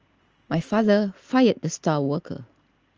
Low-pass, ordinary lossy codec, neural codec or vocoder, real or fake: 7.2 kHz; Opus, 24 kbps; none; real